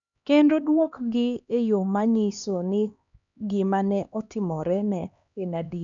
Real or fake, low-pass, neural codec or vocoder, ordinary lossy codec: fake; 7.2 kHz; codec, 16 kHz, 2 kbps, X-Codec, HuBERT features, trained on LibriSpeech; none